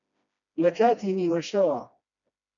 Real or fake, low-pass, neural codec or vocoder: fake; 7.2 kHz; codec, 16 kHz, 1 kbps, FreqCodec, smaller model